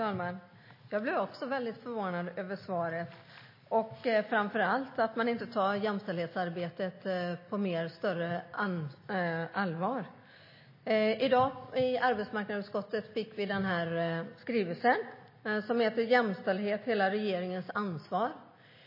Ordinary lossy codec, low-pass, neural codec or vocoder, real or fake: MP3, 24 kbps; 5.4 kHz; none; real